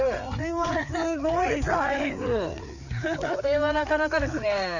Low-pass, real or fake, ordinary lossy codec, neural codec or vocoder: 7.2 kHz; fake; none; codec, 16 kHz, 4 kbps, FreqCodec, larger model